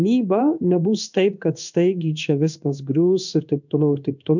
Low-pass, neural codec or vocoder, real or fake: 7.2 kHz; codec, 16 kHz in and 24 kHz out, 1 kbps, XY-Tokenizer; fake